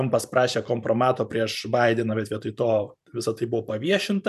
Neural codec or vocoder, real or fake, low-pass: vocoder, 44.1 kHz, 128 mel bands every 512 samples, BigVGAN v2; fake; 14.4 kHz